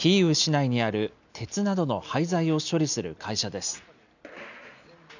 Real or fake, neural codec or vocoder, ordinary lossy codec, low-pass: real; none; none; 7.2 kHz